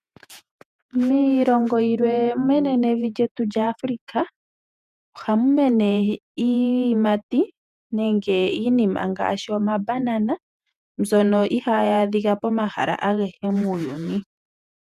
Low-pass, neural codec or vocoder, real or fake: 14.4 kHz; vocoder, 48 kHz, 128 mel bands, Vocos; fake